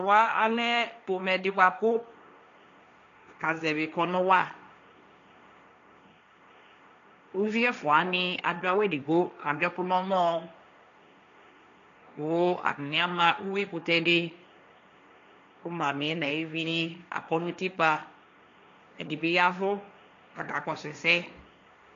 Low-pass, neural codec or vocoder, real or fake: 7.2 kHz; codec, 16 kHz, 1.1 kbps, Voila-Tokenizer; fake